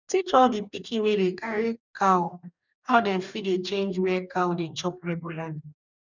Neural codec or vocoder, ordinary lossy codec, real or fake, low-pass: codec, 44.1 kHz, 2.6 kbps, DAC; none; fake; 7.2 kHz